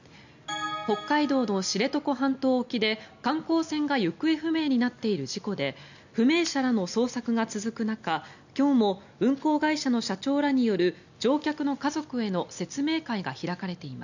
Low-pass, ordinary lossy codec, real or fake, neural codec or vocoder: 7.2 kHz; none; real; none